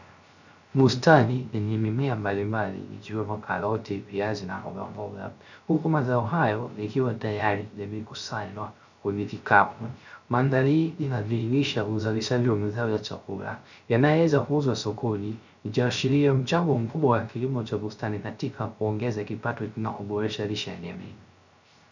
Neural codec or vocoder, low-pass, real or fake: codec, 16 kHz, 0.3 kbps, FocalCodec; 7.2 kHz; fake